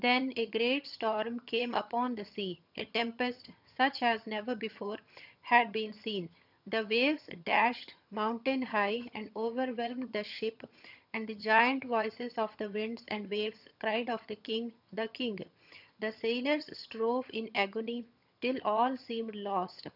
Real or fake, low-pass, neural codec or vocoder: fake; 5.4 kHz; vocoder, 22.05 kHz, 80 mel bands, HiFi-GAN